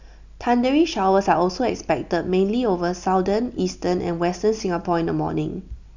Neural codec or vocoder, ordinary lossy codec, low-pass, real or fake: none; none; 7.2 kHz; real